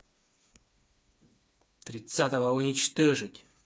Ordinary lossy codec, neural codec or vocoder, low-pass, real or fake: none; codec, 16 kHz, 4 kbps, FreqCodec, smaller model; none; fake